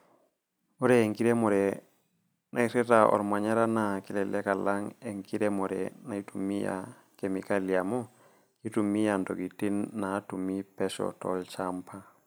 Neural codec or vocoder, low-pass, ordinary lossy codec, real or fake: none; none; none; real